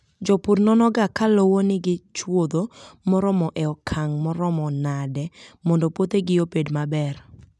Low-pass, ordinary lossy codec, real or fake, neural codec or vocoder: none; none; real; none